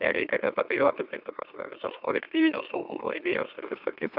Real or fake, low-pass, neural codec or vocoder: fake; 5.4 kHz; autoencoder, 44.1 kHz, a latent of 192 numbers a frame, MeloTTS